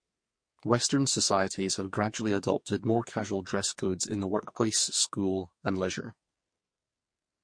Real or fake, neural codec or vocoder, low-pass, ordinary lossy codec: fake; codec, 44.1 kHz, 2.6 kbps, SNAC; 9.9 kHz; MP3, 48 kbps